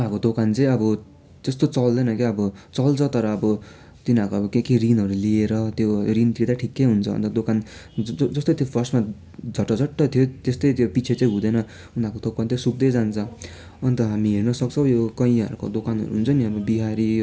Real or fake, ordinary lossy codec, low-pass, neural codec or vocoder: real; none; none; none